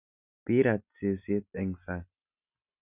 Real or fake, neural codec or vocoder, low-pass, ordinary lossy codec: real; none; 3.6 kHz; none